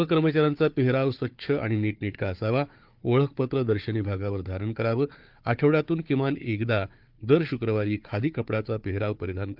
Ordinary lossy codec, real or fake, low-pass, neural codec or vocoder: Opus, 32 kbps; fake; 5.4 kHz; codec, 16 kHz, 4 kbps, FunCodec, trained on Chinese and English, 50 frames a second